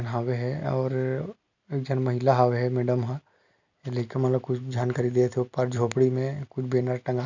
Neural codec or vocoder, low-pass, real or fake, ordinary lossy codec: none; 7.2 kHz; real; none